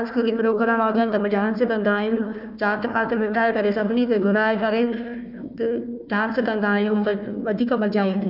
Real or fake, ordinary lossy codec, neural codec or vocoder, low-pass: fake; none; codec, 16 kHz, 1 kbps, FunCodec, trained on Chinese and English, 50 frames a second; 5.4 kHz